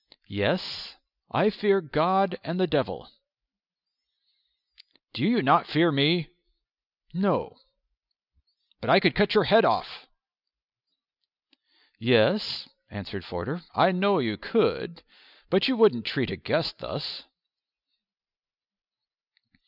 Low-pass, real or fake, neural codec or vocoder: 5.4 kHz; real; none